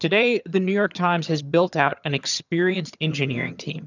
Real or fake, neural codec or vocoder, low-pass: fake; vocoder, 22.05 kHz, 80 mel bands, HiFi-GAN; 7.2 kHz